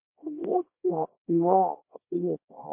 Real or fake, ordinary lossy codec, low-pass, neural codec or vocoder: fake; MP3, 24 kbps; 3.6 kHz; codec, 24 kHz, 0.9 kbps, WavTokenizer, small release